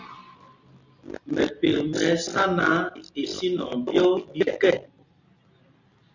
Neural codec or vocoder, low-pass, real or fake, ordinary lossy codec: none; 7.2 kHz; real; Opus, 64 kbps